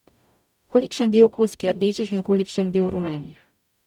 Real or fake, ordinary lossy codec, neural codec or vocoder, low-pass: fake; none; codec, 44.1 kHz, 0.9 kbps, DAC; 19.8 kHz